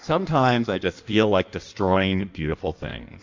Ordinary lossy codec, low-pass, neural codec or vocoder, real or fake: MP3, 64 kbps; 7.2 kHz; codec, 16 kHz in and 24 kHz out, 1.1 kbps, FireRedTTS-2 codec; fake